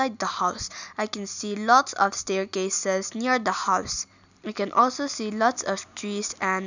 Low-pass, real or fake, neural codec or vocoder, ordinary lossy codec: 7.2 kHz; real; none; none